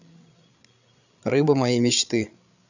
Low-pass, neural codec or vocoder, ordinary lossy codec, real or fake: 7.2 kHz; codec, 16 kHz, 8 kbps, FreqCodec, larger model; none; fake